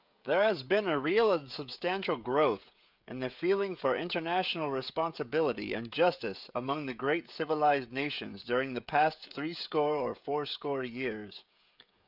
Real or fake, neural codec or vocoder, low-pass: fake; codec, 16 kHz, 16 kbps, FreqCodec, smaller model; 5.4 kHz